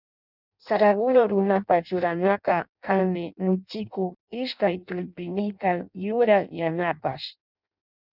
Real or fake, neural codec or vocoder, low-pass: fake; codec, 16 kHz in and 24 kHz out, 0.6 kbps, FireRedTTS-2 codec; 5.4 kHz